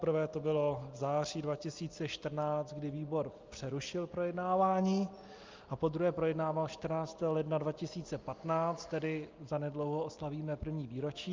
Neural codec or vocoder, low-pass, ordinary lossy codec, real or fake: none; 7.2 kHz; Opus, 24 kbps; real